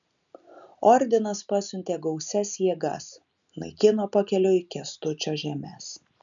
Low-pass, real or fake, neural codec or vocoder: 7.2 kHz; real; none